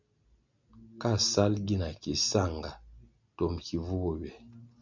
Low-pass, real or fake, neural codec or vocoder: 7.2 kHz; real; none